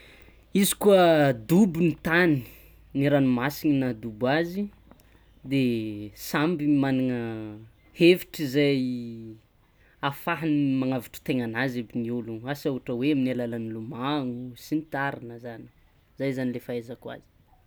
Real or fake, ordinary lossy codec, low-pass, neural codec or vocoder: real; none; none; none